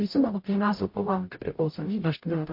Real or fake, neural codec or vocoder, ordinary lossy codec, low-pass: fake; codec, 44.1 kHz, 0.9 kbps, DAC; MP3, 32 kbps; 5.4 kHz